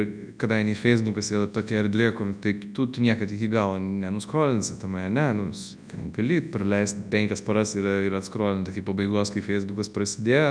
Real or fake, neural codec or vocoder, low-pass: fake; codec, 24 kHz, 0.9 kbps, WavTokenizer, large speech release; 9.9 kHz